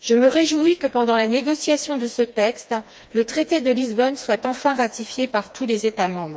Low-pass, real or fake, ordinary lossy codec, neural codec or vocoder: none; fake; none; codec, 16 kHz, 2 kbps, FreqCodec, smaller model